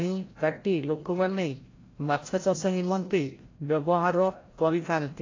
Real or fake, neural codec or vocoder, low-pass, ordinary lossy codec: fake; codec, 16 kHz, 0.5 kbps, FreqCodec, larger model; 7.2 kHz; AAC, 32 kbps